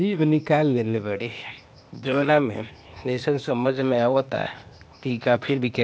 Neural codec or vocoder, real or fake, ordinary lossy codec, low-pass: codec, 16 kHz, 0.8 kbps, ZipCodec; fake; none; none